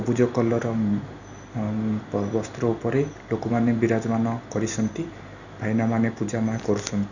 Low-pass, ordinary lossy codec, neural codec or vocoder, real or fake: 7.2 kHz; none; none; real